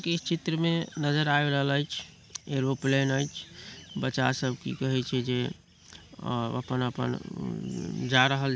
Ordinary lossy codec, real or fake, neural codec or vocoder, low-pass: none; real; none; none